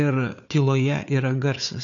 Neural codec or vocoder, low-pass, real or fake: codec, 16 kHz, 4 kbps, FunCodec, trained on Chinese and English, 50 frames a second; 7.2 kHz; fake